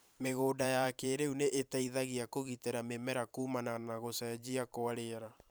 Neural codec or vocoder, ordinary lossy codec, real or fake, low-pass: vocoder, 44.1 kHz, 128 mel bands every 512 samples, BigVGAN v2; none; fake; none